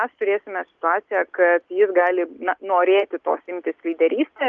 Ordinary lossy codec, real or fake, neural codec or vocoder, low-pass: Opus, 24 kbps; real; none; 7.2 kHz